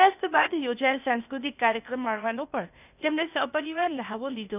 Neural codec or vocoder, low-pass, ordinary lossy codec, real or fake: codec, 16 kHz, 0.8 kbps, ZipCodec; 3.6 kHz; none; fake